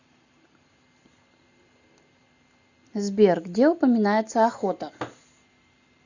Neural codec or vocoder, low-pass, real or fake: none; 7.2 kHz; real